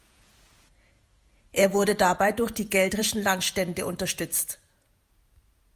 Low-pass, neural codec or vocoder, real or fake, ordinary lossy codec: 14.4 kHz; vocoder, 48 kHz, 128 mel bands, Vocos; fake; Opus, 32 kbps